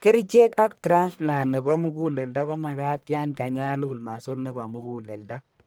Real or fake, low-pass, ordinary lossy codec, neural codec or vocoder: fake; none; none; codec, 44.1 kHz, 1.7 kbps, Pupu-Codec